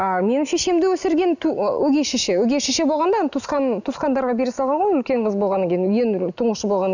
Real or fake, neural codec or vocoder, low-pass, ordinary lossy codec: real; none; 7.2 kHz; none